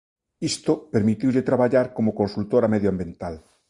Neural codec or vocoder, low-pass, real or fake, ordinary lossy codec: none; 10.8 kHz; real; Opus, 64 kbps